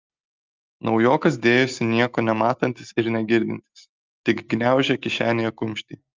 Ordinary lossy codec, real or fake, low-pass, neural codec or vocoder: Opus, 24 kbps; real; 7.2 kHz; none